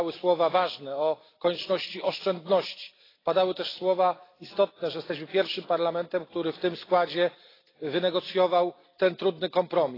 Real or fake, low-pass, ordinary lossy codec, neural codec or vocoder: real; 5.4 kHz; AAC, 24 kbps; none